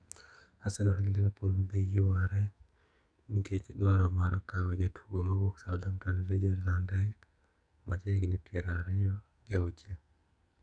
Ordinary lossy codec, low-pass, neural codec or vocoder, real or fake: none; 9.9 kHz; codec, 44.1 kHz, 2.6 kbps, SNAC; fake